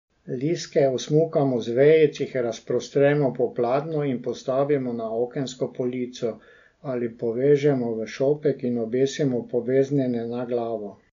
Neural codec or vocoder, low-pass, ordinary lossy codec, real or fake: none; 7.2 kHz; MP3, 64 kbps; real